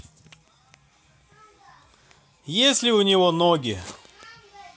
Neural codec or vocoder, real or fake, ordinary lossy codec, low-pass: none; real; none; none